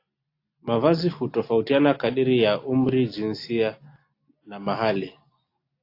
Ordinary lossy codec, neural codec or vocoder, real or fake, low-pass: AAC, 32 kbps; none; real; 5.4 kHz